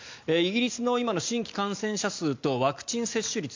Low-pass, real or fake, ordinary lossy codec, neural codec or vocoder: 7.2 kHz; real; MP3, 64 kbps; none